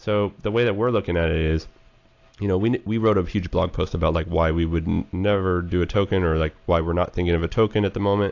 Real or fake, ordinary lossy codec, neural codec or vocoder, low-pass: real; AAC, 48 kbps; none; 7.2 kHz